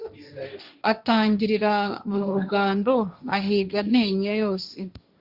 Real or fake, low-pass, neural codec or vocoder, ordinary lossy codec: fake; 5.4 kHz; codec, 16 kHz, 1.1 kbps, Voila-Tokenizer; Opus, 64 kbps